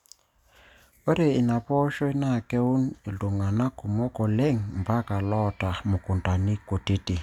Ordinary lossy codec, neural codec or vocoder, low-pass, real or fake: none; none; 19.8 kHz; real